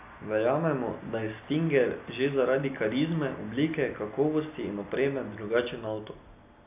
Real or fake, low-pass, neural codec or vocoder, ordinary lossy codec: real; 3.6 kHz; none; AAC, 32 kbps